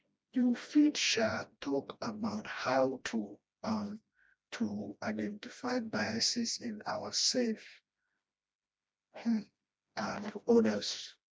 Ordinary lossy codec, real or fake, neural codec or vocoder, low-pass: none; fake; codec, 16 kHz, 1 kbps, FreqCodec, smaller model; none